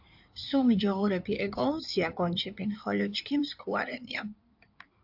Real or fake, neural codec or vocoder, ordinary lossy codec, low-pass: fake; codec, 16 kHz in and 24 kHz out, 2.2 kbps, FireRedTTS-2 codec; AAC, 48 kbps; 5.4 kHz